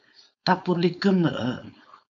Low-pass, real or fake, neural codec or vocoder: 7.2 kHz; fake; codec, 16 kHz, 4.8 kbps, FACodec